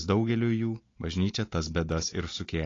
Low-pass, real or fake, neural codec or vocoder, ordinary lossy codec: 7.2 kHz; real; none; AAC, 32 kbps